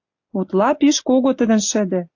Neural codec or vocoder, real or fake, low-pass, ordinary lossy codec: none; real; 7.2 kHz; AAC, 48 kbps